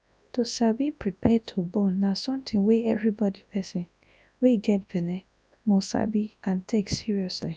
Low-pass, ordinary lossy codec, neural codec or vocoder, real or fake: 9.9 kHz; none; codec, 24 kHz, 0.9 kbps, WavTokenizer, large speech release; fake